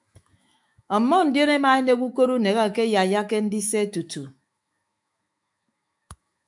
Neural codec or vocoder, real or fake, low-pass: autoencoder, 48 kHz, 128 numbers a frame, DAC-VAE, trained on Japanese speech; fake; 10.8 kHz